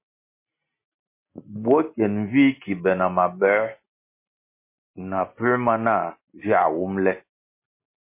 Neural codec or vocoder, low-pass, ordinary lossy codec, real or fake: none; 3.6 kHz; MP3, 24 kbps; real